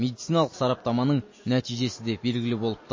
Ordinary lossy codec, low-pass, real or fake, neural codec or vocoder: MP3, 32 kbps; 7.2 kHz; real; none